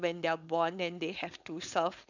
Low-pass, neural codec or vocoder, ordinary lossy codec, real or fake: 7.2 kHz; codec, 16 kHz, 4.8 kbps, FACodec; none; fake